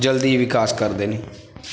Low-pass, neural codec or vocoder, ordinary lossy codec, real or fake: none; none; none; real